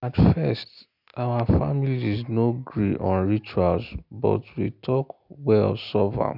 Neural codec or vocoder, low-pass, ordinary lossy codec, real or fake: none; 5.4 kHz; none; real